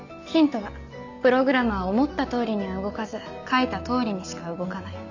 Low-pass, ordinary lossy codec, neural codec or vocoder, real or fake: 7.2 kHz; none; none; real